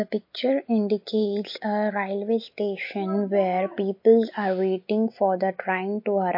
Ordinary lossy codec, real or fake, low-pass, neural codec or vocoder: MP3, 32 kbps; real; 5.4 kHz; none